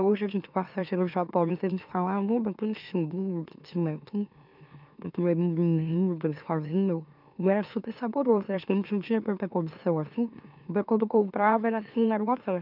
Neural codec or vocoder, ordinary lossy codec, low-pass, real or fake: autoencoder, 44.1 kHz, a latent of 192 numbers a frame, MeloTTS; none; 5.4 kHz; fake